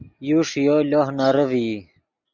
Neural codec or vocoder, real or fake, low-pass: none; real; 7.2 kHz